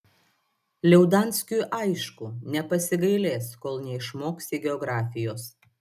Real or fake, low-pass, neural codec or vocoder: real; 14.4 kHz; none